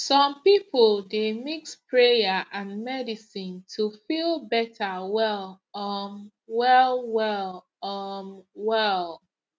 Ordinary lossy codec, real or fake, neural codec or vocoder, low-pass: none; real; none; none